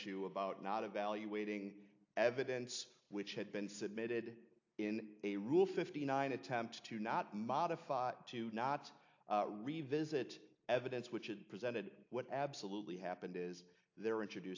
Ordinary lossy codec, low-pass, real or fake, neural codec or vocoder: AAC, 48 kbps; 7.2 kHz; real; none